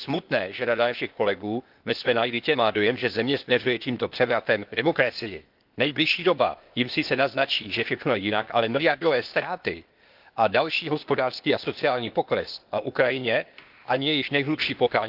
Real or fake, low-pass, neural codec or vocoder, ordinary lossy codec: fake; 5.4 kHz; codec, 16 kHz, 0.8 kbps, ZipCodec; Opus, 32 kbps